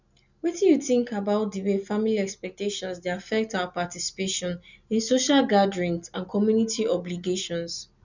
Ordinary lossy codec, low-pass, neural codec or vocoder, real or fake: none; 7.2 kHz; none; real